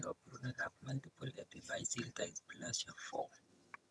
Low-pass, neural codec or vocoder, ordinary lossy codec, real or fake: none; vocoder, 22.05 kHz, 80 mel bands, HiFi-GAN; none; fake